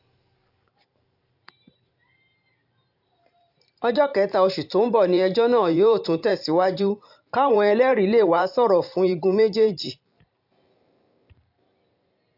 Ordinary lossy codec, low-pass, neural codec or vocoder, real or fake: AAC, 48 kbps; 5.4 kHz; vocoder, 44.1 kHz, 128 mel bands every 512 samples, BigVGAN v2; fake